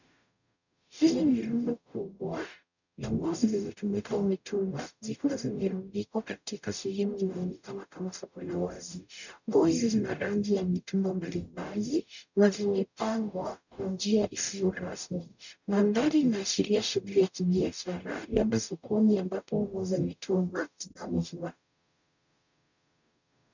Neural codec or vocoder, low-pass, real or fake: codec, 44.1 kHz, 0.9 kbps, DAC; 7.2 kHz; fake